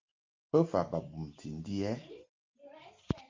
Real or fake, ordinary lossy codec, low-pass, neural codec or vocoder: real; Opus, 24 kbps; 7.2 kHz; none